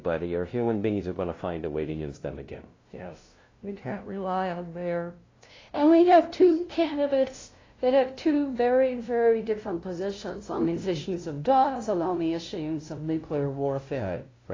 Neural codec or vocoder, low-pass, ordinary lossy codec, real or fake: codec, 16 kHz, 0.5 kbps, FunCodec, trained on LibriTTS, 25 frames a second; 7.2 kHz; AAC, 32 kbps; fake